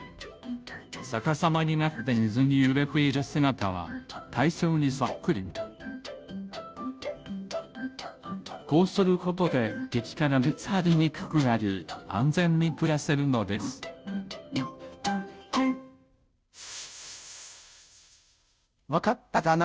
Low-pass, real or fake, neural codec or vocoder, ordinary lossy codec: none; fake; codec, 16 kHz, 0.5 kbps, FunCodec, trained on Chinese and English, 25 frames a second; none